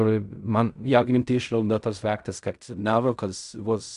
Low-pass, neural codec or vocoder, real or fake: 10.8 kHz; codec, 16 kHz in and 24 kHz out, 0.4 kbps, LongCat-Audio-Codec, fine tuned four codebook decoder; fake